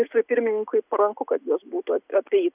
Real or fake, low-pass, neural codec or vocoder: real; 3.6 kHz; none